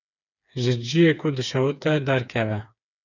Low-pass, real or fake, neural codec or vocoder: 7.2 kHz; fake; codec, 16 kHz, 4 kbps, FreqCodec, smaller model